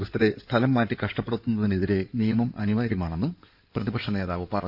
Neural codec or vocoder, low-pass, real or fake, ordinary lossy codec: codec, 16 kHz in and 24 kHz out, 2.2 kbps, FireRedTTS-2 codec; 5.4 kHz; fake; none